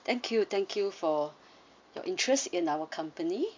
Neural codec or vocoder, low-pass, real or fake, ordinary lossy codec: none; 7.2 kHz; real; MP3, 64 kbps